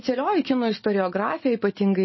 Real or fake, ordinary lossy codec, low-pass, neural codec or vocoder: real; MP3, 24 kbps; 7.2 kHz; none